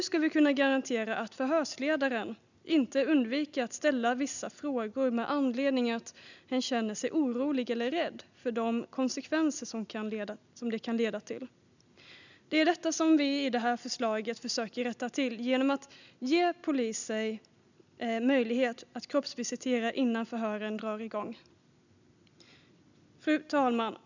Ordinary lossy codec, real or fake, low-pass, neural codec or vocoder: none; real; 7.2 kHz; none